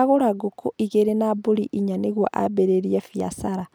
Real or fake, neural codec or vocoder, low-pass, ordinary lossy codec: real; none; none; none